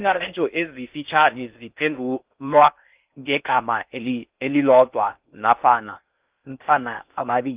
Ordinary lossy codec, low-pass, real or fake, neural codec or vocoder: Opus, 24 kbps; 3.6 kHz; fake; codec, 16 kHz in and 24 kHz out, 0.6 kbps, FocalCodec, streaming, 4096 codes